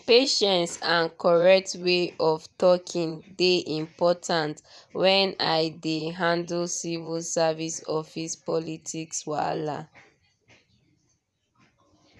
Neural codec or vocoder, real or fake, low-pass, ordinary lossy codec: vocoder, 24 kHz, 100 mel bands, Vocos; fake; none; none